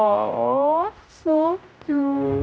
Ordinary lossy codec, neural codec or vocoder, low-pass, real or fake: none; codec, 16 kHz, 0.5 kbps, X-Codec, HuBERT features, trained on general audio; none; fake